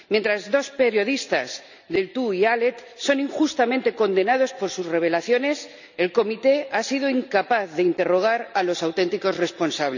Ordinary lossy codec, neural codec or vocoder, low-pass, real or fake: none; none; 7.2 kHz; real